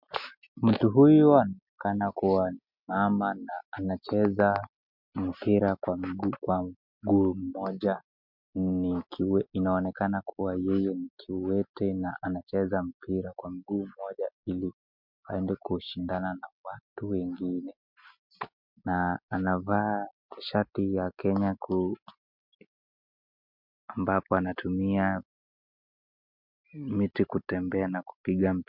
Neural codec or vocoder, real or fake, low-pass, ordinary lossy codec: none; real; 5.4 kHz; MP3, 48 kbps